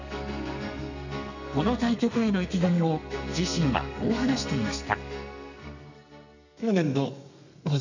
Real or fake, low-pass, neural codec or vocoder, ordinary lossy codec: fake; 7.2 kHz; codec, 32 kHz, 1.9 kbps, SNAC; none